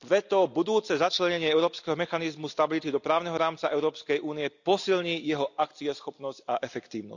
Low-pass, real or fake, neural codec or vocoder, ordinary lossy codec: 7.2 kHz; real; none; none